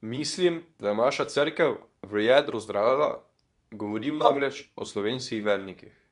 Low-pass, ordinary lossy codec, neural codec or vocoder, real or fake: 10.8 kHz; MP3, 96 kbps; codec, 24 kHz, 0.9 kbps, WavTokenizer, medium speech release version 2; fake